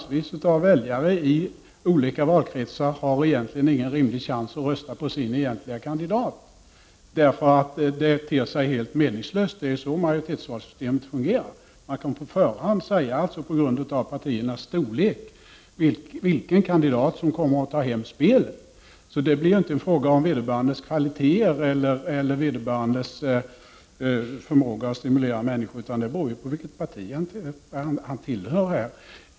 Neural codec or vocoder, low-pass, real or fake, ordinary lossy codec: none; none; real; none